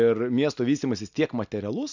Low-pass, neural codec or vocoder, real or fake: 7.2 kHz; none; real